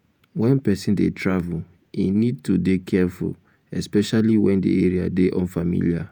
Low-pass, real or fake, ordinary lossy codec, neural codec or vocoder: none; fake; none; vocoder, 48 kHz, 128 mel bands, Vocos